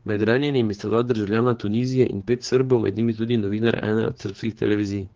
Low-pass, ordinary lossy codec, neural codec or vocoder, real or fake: 7.2 kHz; Opus, 16 kbps; codec, 16 kHz, 4 kbps, X-Codec, HuBERT features, trained on general audio; fake